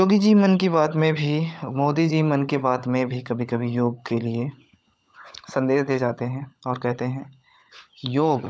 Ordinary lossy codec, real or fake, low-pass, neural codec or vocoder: none; fake; none; codec, 16 kHz, 16 kbps, FunCodec, trained on LibriTTS, 50 frames a second